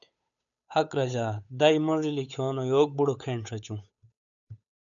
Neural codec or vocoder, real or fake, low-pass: codec, 16 kHz, 8 kbps, FunCodec, trained on Chinese and English, 25 frames a second; fake; 7.2 kHz